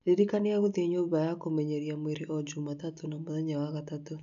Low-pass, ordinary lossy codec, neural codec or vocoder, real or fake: 7.2 kHz; AAC, 96 kbps; none; real